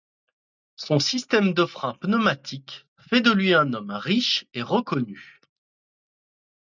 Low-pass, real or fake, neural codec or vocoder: 7.2 kHz; real; none